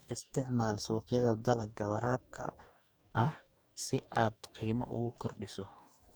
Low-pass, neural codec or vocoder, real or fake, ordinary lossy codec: none; codec, 44.1 kHz, 2.6 kbps, DAC; fake; none